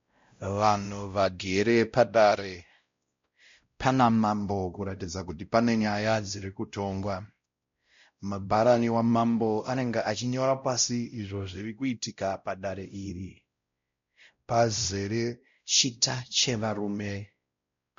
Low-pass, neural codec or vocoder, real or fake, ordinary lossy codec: 7.2 kHz; codec, 16 kHz, 0.5 kbps, X-Codec, WavLM features, trained on Multilingual LibriSpeech; fake; MP3, 48 kbps